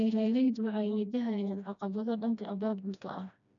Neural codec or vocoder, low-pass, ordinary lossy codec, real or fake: codec, 16 kHz, 1 kbps, FreqCodec, smaller model; 7.2 kHz; none; fake